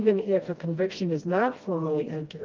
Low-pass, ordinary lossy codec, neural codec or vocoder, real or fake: 7.2 kHz; Opus, 24 kbps; codec, 16 kHz, 1 kbps, FreqCodec, smaller model; fake